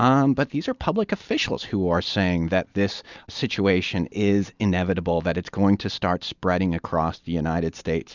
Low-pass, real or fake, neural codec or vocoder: 7.2 kHz; real; none